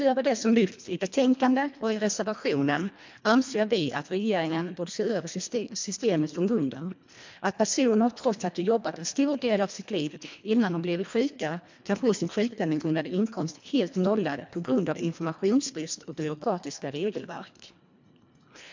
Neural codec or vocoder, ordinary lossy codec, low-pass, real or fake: codec, 24 kHz, 1.5 kbps, HILCodec; MP3, 64 kbps; 7.2 kHz; fake